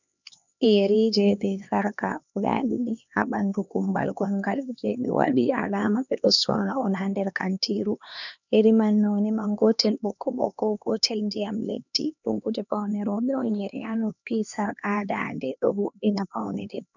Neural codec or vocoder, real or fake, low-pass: codec, 16 kHz, 2 kbps, X-Codec, HuBERT features, trained on LibriSpeech; fake; 7.2 kHz